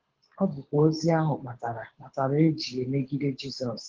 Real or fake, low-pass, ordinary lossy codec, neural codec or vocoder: fake; 7.2 kHz; Opus, 16 kbps; codec, 24 kHz, 6 kbps, HILCodec